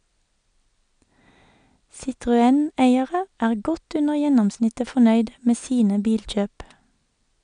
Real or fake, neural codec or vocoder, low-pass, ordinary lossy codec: real; none; 9.9 kHz; none